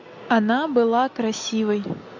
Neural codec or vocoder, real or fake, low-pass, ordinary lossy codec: none; real; 7.2 kHz; AAC, 48 kbps